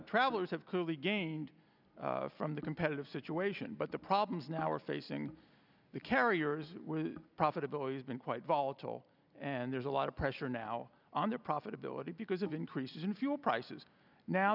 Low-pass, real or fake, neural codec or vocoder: 5.4 kHz; real; none